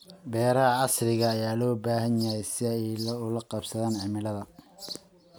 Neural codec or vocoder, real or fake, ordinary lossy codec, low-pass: none; real; none; none